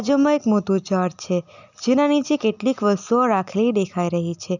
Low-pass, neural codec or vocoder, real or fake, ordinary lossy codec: 7.2 kHz; none; real; none